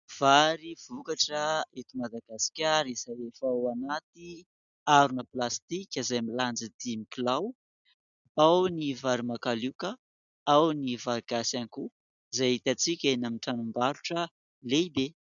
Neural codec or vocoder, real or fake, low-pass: none; real; 7.2 kHz